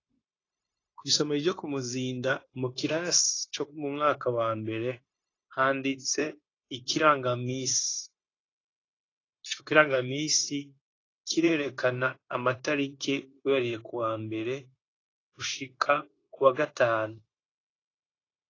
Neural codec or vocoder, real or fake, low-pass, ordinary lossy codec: codec, 16 kHz, 0.9 kbps, LongCat-Audio-Codec; fake; 7.2 kHz; AAC, 32 kbps